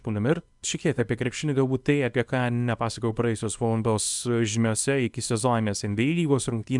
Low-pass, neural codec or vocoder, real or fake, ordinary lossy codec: 10.8 kHz; codec, 24 kHz, 0.9 kbps, WavTokenizer, small release; fake; MP3, 96 kbps